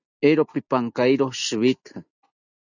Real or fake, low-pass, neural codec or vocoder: real; 7.2 kHz; none